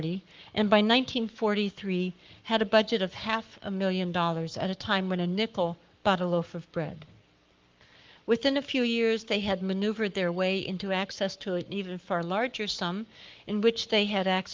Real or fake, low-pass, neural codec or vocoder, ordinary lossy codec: fake; 7.2 kHz; codec, 44.1 kHz, 7.8 kbps, Pupu-Codec; Opus, 32 kbps